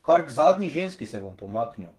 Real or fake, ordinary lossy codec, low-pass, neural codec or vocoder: fake; Opus, 32 kbps; 14.4 kHz; codec, 32 kHz, 1.9 kbps, SNAC